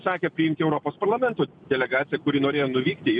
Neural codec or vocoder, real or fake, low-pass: none; real; 9.9 kHz